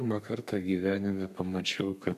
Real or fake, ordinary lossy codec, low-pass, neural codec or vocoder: fake; AAC, 96 kbps; 14.4 kHz; codec, 32 kHz, 1.9 kbps, SNAC